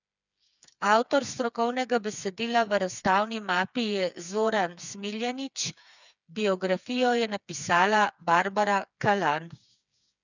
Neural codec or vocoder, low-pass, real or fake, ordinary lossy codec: codec, 16 kHz, 4 kbps, FreqCodec, smaller model; 7.2 kHz; fake; none